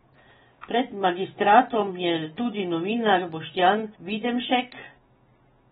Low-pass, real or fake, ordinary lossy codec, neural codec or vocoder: 10.8 kHz; real; AAC, 16 kbps; none